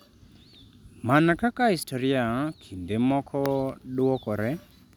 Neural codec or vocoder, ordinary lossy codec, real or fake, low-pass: none; none; real; 19.8 kHz